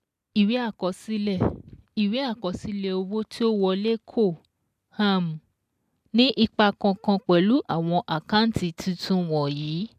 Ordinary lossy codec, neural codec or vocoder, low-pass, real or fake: AAC, 96 kbps; none; 14.4 kHz; real